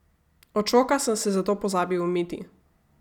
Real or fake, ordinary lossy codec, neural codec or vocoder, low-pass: real; none; none; 19.8 kHz